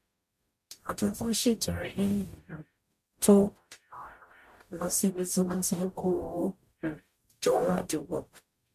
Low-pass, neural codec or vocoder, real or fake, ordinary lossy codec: 14.4 kHz; codec, 44.1 kHz, 0.9 kbps, DAC; fake; MP3, 64 kbps